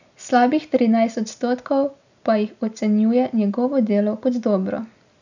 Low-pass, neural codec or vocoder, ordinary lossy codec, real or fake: 7.2 kHz; none; none; real